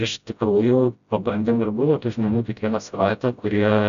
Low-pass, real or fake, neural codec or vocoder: 7.2 kHz; fake; codec, 16 kHz, 0.5 kbps, FreqCodec, smaller model